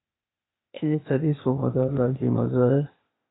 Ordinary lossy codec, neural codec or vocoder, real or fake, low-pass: AAC, 16 kbps; codec, 16 kHz, 0.8 kbps, ZipCodec; fake; 7.2 kHz